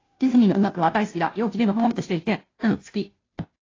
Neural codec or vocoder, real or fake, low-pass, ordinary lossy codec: codec, 16 kHz, 0.5 kbps, FunCodec, trained on Chinese and English, 25 frames a second; fake; 7.2 kHz; AAC, 32 kbps